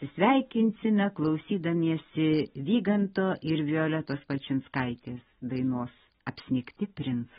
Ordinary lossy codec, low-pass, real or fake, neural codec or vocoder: AAC, 16 kbps; 7.2 kHz; real; none